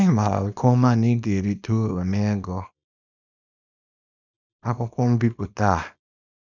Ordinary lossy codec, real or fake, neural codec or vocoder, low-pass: none; fake; codec, 24 kHz, 0.9 kbps, WavTokenizer, small release; 7.2 kHz